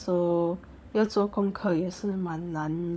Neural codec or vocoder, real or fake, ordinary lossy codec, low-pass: codec, 16 kHz, 16 kbps, FunCodec, trained on LibriTTS, 50 frames a second; fake; none; none